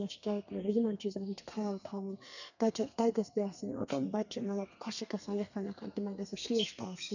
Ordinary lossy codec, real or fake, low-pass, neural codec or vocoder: none; fake; 7.2 kHz; codec, 32 kHz, 1.9 kbps, SNAC